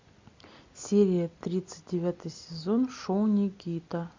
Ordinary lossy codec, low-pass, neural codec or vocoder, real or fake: MP3, 64 kbps; 7.2 kHz; none; real